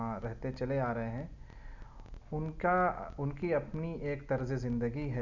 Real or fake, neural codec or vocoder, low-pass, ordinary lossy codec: real; none; 7.2 kHz; none